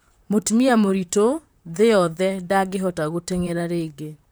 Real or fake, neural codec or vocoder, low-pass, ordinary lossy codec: fake; vocoder, 44.1 kHz, 128 mel bands, Pupu-Vocoder; none; none